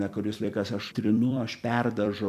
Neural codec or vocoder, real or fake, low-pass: vocoder, 44.1 kHz, 128 mel bands every 256 samples, BigVGAN v2; fake; 14.4 kHz